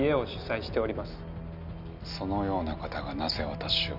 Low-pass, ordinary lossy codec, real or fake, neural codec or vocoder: 5.4 kHz; none; real; none